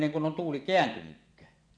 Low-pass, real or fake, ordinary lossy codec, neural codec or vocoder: 9.9 kHz; fake; none; vocoder, 24 kHz, 100 mel bands, Vocos